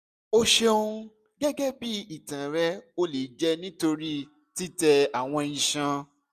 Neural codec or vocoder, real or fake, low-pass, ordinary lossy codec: none; real; 14.4 kHz; none